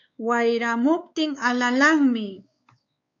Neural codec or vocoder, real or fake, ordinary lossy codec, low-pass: codec, 16 kHz, 4 kbps, X-Codec, WavLM features, trained on Multilingual LibriSpeech; fake; AAC, 48 kbps; 7.2 kHz